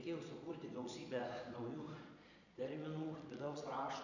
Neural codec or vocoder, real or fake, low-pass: vocoder, 22.05 kHz, 80 mel bands, Vocos; fake; 7.2 kHz